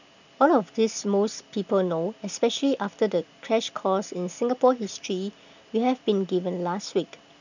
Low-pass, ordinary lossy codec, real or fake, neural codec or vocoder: 7.2 kHz; none; real; none